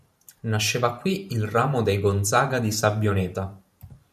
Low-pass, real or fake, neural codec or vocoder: 14.4 kHz; fake; vocoder, 44.1 kHz, 128 mel bands every 512 samples, BigVGAN v2